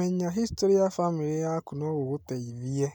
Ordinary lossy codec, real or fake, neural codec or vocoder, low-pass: none; real; none; none